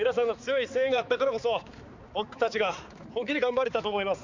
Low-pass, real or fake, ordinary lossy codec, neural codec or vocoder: 7.2 kHz; fake; Opus, 64 kbps; codec, 16 kHz, 4 kbps, X-Codec, HuBERT features, trained on balanced general audio